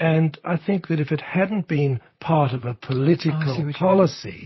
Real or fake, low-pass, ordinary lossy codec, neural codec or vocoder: fake; 7.2 kHz; MP3, 24 kbps; vocoder, 44.1 kHz, 128 mel bands, Pupu-Vocoder